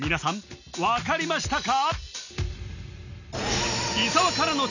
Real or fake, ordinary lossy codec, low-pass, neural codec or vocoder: real; none; 7.2 kHz; none